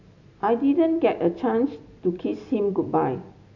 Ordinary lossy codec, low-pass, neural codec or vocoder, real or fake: none; 7.2 kHz; none; real